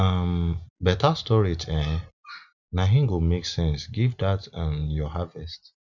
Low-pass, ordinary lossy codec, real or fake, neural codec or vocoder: 7.2 kHz; none; real; none